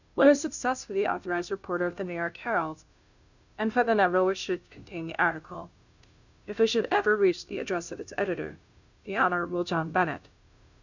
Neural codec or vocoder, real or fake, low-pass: codec, 16 kHz, 0.5 kbps, FunCodec, trained on Chinese and English, 25 frames a second; fake; 7.2 kHz